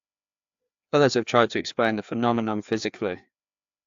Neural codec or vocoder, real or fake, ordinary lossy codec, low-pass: codec, 16 kHz, 2 kbps, FreqCodec, larger model; fake; AAC, 64 kbps; 7.2 kHz